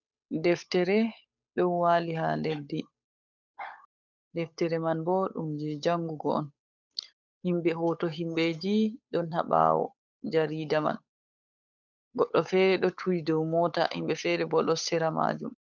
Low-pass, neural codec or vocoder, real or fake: 7.2 kHz; codec, 16 kHz, 8 kbps, FunCodec, trained on Chinese and English, 25 frames a second; fake